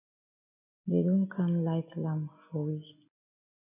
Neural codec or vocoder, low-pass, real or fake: none; 3.6 kHz; real